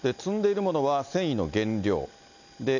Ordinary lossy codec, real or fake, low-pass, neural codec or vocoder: none; real; 7.2 kHz; none